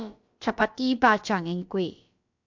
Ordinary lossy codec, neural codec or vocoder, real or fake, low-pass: MP3, 64 kbps; codec, 16 kHz, about 1 kbps, DyCAST, with the encoder's durations; fake; 7.2 kHz